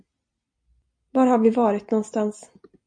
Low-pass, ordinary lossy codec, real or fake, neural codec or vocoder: 9.9 kHz; MP3, 48 kbps; real; none